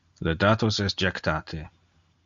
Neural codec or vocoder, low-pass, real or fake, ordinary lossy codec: none; 7.2 kHz; real; MP3, 64 kbps